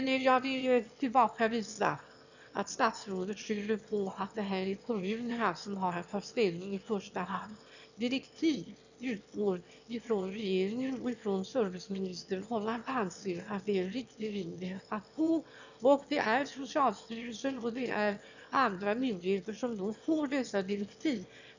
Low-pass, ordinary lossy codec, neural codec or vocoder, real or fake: 7.2 kHz; none; autoencoder, 22.05 kHz, a latent of 192 numbers a frame, VITS, trained on one speaker; fake